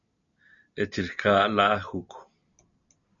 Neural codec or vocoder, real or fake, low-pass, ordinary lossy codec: none; real; 7.2 kHz; MP3, 96 kbps